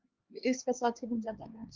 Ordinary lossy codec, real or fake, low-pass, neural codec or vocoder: Opus, 32 kbps; fake; 7.2 kHz; codec, 16 kHz, 4 kbps, X-Codec, HuBERT features, trained on LibriSpeech